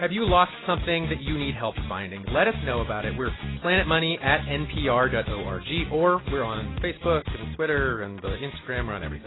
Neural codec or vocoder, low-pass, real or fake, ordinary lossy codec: none; 7.2 kHz; real; AAC, 16 kbps